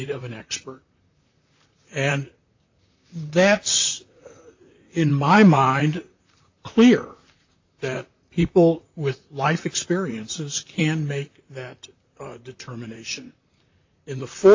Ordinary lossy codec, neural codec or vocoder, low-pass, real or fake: AAC, 48 kbps; vocoder, 44.1 kHz, 128 mel bands, Pupu-Vocoder; 7.2 kHz; fake